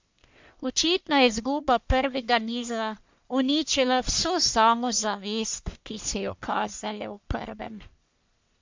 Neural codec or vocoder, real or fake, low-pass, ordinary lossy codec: codec, 44.1 kHz, 1.7 kbps, Pupu-Codec; fake; 7.2 kHz; MP3, 64 kbps